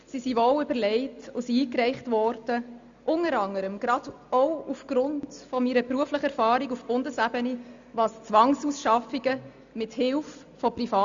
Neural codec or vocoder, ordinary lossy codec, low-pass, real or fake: none; Opus, 64 kbps; 7.2 kHz; real